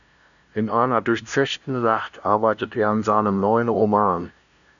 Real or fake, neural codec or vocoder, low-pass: fake; codec, 16 kHz, 0.5 kbps, FunCodec, trained on LibriTTS, 25 frames a second; 7.2 kHz